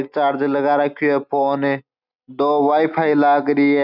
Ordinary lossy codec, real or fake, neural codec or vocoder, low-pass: none; real; none; 5.4 kHz